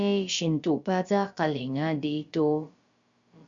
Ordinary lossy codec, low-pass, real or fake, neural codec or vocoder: Opus, 64 kbps; 7.2 kHz; fake; codec, 16 kHz, about 1 kbps, DyCAST, with the encoder's durations